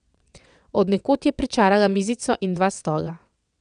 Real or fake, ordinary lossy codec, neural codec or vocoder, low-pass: fake; none; vocoder, 22.05 kHz, 80 mel bands, WaveNeXt; 9.9 kHz